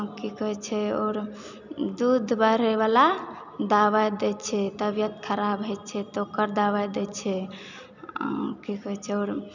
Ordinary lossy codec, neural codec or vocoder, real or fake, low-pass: none; none; real; 7.2 kHz